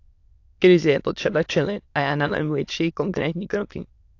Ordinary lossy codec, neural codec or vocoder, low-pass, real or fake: AAC, 48 kbps; autoencoder, 22.05 kHz, a latent of 192 numbers a frame, VITS, trained on many speakers; 7.2 kHz; fake